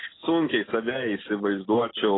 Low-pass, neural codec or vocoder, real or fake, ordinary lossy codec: 7.2 kHz; none; real; AAC, 16 kbps